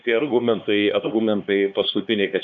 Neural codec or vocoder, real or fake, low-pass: codec, 16 kHz, 2 kbps, X-Codec, HuBERT features, trained on LibriSpeech; fake; 7.2 kHz